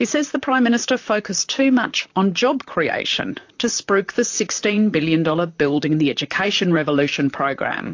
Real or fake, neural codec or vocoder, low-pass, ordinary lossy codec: fake; vocoder, 44.1 kHz, 128 mel bands, Pupu-Vocoder; 7.2 kHz; AAC, 48 kbps